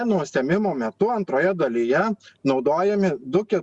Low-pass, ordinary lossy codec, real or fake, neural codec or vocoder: 10.8 kHz; Opus, 64 kbps; real; none